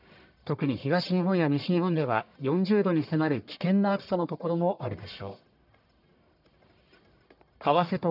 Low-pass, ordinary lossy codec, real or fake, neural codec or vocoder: 5.4 kHz; none; fake; codec, 44.1 kHz, 1.7 kbps, Pupu-Codec